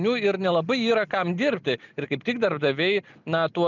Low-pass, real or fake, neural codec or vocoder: 7.2 kHz; real; none